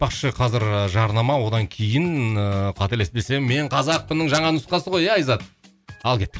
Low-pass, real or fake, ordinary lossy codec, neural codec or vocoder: none; real; none; none